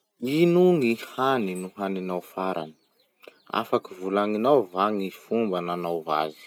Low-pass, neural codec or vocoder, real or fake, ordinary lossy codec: 19.8 kHz; none; real; none